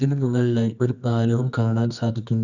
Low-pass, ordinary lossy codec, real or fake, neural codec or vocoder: 7.2 kHz; none; fake; codec, 24 kHz, 0.9 kbps, WavTokenizer, medium music audio release